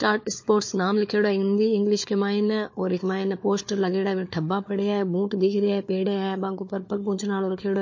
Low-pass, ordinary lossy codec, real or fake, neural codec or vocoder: 7.2 kHz; MP3, 32 kbps; fake; codec, 16 kHz, 4 kbps, FunCodec, trained on Chinese and English, 50 frames a second